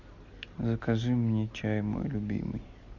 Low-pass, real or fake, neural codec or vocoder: 7.2 kHz; real; none